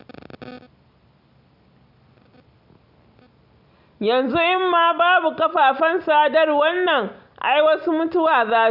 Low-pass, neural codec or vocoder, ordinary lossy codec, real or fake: 5.4 kHz; none; none; real